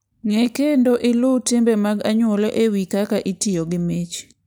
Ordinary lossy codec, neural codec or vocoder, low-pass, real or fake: none; none; none; real